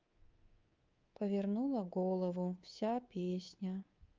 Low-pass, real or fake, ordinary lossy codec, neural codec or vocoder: 7.2 kHz; fake; Opus, 32 kbps; codec, 24 kHz, 3.1 kbps, DualCodec